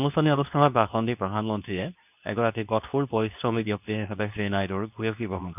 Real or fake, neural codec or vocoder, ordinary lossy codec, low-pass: fake; codec, 24 kHz, 0.9 kbps, WavTokenizer, medium speech release version 2; none; 3.6 kHz